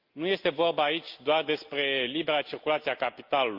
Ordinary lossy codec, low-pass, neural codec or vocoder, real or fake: Opus, 24 kbps; 5.4 kHz; none; real